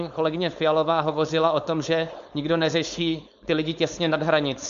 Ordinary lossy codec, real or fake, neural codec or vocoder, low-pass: MP3, 64 kbps; fake; codec, 16 kHz, 4.8 kbps, FACodec; 7.2 kHz